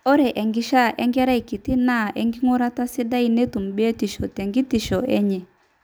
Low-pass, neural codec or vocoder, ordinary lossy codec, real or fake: none; none; none; real